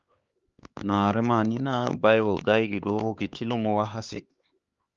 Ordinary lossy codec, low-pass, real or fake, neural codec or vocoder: Opus, 32 kbps; 7.2 kHz; fake; codec, 16 kHz, 2 kbps, X-Codec, HuBERT features, trained on LibriSpeech